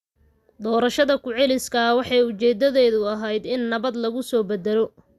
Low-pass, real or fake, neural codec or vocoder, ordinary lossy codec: 14.4 kHz; real; none; Opus, 64 kbps